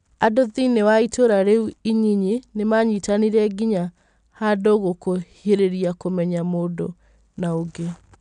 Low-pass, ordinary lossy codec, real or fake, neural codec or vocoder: 9.9 kHz; none; real; none